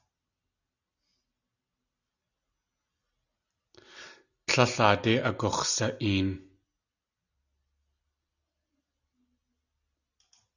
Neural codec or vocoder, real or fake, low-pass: none; real; 7.2 kHz